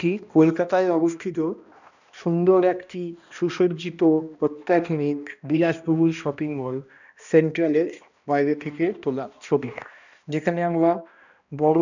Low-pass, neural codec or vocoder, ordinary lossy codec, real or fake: 7.2 kHz; codec, 16 kHz, 1 kbps, X-Codec, HuBERT features, trained on balanced general audio; none; fake